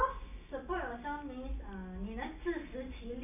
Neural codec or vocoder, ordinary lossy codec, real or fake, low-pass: none; none; real; 3.6 kHz